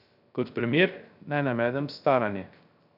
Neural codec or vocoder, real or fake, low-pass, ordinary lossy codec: codec, 16 kHz, 0.3 kbps, FocalCodec; fake; 5.4 kHz; none